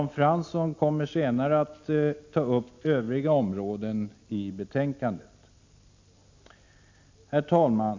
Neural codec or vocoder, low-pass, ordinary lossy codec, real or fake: none; 7.2 kHz; MP3, 48 kbps; real